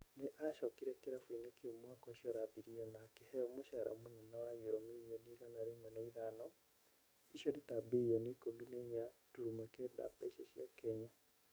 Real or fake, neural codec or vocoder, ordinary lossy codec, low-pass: fake; codec, 44.1 kHz, 7.8 kbps, DAC; none; none